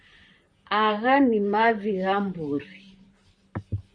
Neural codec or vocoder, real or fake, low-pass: vocoder, 44.1 kHz, 128 mel bands, Pupu-Vocoder; fake; 9.9 kHz